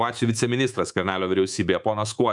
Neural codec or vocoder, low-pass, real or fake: codec, 24 kHz, 3.1 kbps, DualCodec; 10.8 kHz; fake